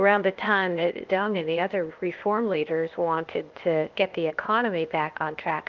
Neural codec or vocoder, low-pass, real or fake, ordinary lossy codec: codec, 16 kHz, 0.8 kbps, ZipCodec; 7.2 kHz; fake; Opus, 32 kbps